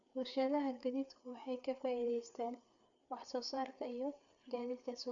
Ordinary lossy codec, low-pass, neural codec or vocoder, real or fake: none; 7.2 kHz; codec, 16 kHz, 4 kbps, FreqCodec, larger model; fake